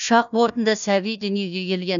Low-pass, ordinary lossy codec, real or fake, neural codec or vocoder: 7.2 kHz; none; fake; codec, 16 kHz, 1 kbps, FunCodec, trained on Chinese and English, 50 frames a second